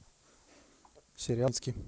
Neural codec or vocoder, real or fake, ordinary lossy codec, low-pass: none; real; none; none